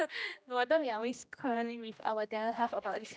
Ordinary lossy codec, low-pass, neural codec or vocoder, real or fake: none; none; codec, 16 kHz, 1 kbps, X-Codec, HuBERT features, trained on general audio; fake